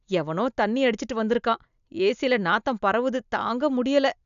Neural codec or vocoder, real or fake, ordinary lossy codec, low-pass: none; real; none; 7.2 kHz